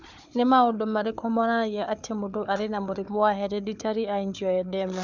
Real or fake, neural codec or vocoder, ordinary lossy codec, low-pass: fake; codec, 16 kHz, 4 kbps, FunCodec, trained on Chinese and English, 50 frames a second; none; 7.2 kHz